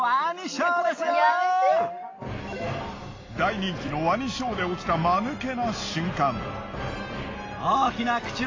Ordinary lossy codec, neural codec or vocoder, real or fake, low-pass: AAC, 32 kbps; none; real; 7.2 kHz